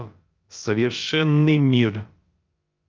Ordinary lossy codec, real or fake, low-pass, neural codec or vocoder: Opus, 24 kbps; fake; 7.2 kHz; codec, 16 kHz, about 1 kbps, DyCAST, with the encoder's durations